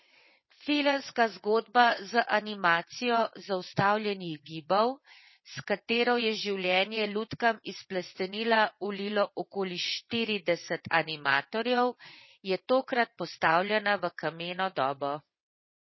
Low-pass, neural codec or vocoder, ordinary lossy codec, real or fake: 7.2 kHz; vocoder, 22.05 kHz, 80 mel bands, WaveNeXt; MP3, 24 kbps; fake